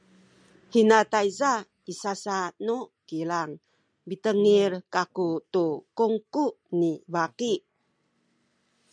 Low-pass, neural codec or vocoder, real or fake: 9.9 kHz; none; real